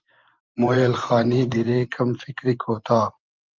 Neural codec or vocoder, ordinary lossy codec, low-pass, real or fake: vocoder, 44.1 kHz, 128 mel bands every 512 samples, BigVGAN v2; Opus, 32 kbps; 7.2 kHz; fake